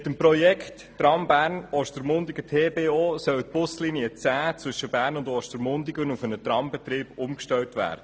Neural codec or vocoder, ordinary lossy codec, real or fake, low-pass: none; none; real; none